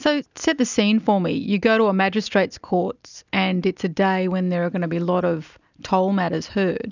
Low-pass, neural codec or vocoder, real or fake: 7.2 kHz; none; real